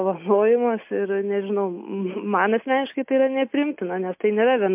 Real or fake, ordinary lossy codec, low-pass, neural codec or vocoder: real; MP3, 32 kbps; 3.6 kHz; none